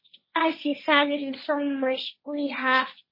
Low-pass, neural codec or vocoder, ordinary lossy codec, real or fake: 5.4 kHz; codec, 16 kHz, 1.1 kbps, Voila-Tokenizer; MP3, 24 kbps; fake